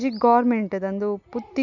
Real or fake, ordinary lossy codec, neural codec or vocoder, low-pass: real; none; none; 7.2 kHz